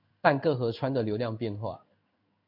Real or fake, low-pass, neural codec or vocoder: fake; 5.4 kHz; codec, 16 kHz in and 24 kHz out, 1 kbps, XY-Tokenizer